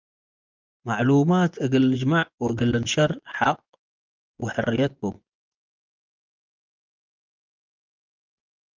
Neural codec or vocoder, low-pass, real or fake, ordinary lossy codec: vocoder, 24 kHz, 100 mel bands, Vocos; 7.2 kHz; fake; Opus, 32 kbps